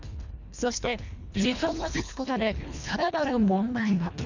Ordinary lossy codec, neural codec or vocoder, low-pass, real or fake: none; codec, 24 kHz, 1.5 kbps, HILCodec; 7.2 kHz; fake